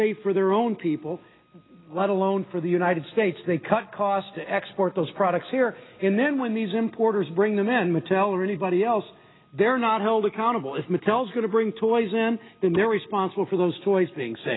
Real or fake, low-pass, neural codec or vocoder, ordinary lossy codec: real; 7.2 kHz; none; AAC, 16 kbps